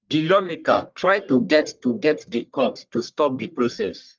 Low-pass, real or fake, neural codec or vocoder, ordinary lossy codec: 7.2 kHz; fake; codec, 44.1 kHz, 1.7 kbps, Pupu-Codec; Opus, 24 kbps